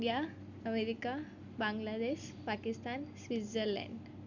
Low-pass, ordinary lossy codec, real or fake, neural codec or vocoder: 7.2 kHz; none; real; none